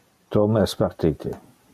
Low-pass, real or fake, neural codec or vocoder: 14.4 kHz; real; none